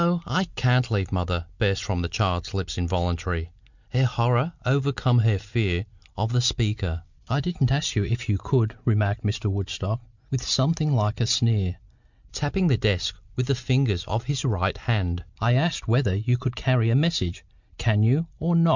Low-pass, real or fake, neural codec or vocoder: 7.2 kHz; real; none